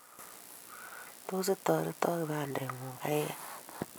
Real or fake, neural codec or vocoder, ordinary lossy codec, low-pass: real; none; none; none